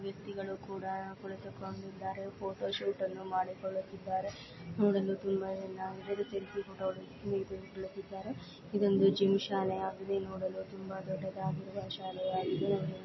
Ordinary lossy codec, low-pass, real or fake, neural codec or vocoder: MP3, 24 kbps; 7.2 kHz; real; none